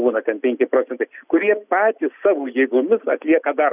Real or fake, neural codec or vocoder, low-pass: real; none; 3.6 kHz